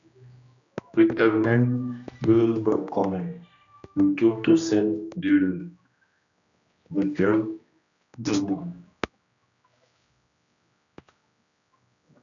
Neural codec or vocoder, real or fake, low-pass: codec, 16 kHz, 1 kbps, X-Codec, HuBERT features, trained on general audio; fake; 7.2 kHz